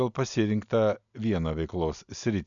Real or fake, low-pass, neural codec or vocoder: real; 7.2 kHz; none